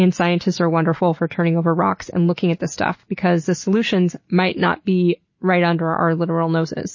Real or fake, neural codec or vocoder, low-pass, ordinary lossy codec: real; none; 7.2 kHz; MP3, 32 kbps